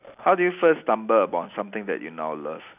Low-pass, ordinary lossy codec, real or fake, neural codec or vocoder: 3.6 kHz; none; real; none